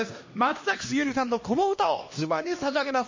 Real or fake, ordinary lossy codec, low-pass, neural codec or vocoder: fake; MP3, 32 kbps; 7.2 kHz; codec, 16 kHz, 1 kbps, X-Codec, HuBERT features, trained on LibriSpeech